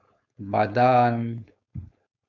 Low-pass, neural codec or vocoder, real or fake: 7.2 kHz; codec, 16 kHz, 4.8 kbps, FACodec; fake